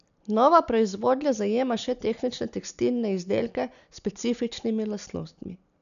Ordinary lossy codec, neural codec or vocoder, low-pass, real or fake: none; none; 7.2 kHz; real